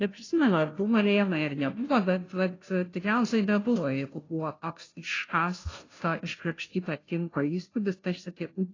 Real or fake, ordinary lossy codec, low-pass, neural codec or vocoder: fake; AAC, 32 kbps; 7.2 kHz; codec, 16 kHz, 0.5 kbps, FunCodec, trained on Chinese and English, 25 frames a second